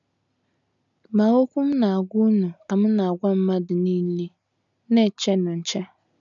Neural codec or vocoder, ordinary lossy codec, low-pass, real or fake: none; none; 7.2 kHz; real